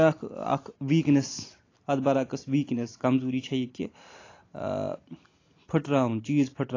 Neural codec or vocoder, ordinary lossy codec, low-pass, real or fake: none; AAC, 32 kbps; 7.2 kHz; real